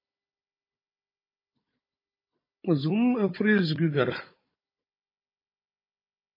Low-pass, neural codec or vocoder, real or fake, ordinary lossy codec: 5.4 kHz; codec, 16 kHz, 16 kbps, FunCodec, trained on Chinese and English, 50 frames a second; fake; MP3, 24 kbps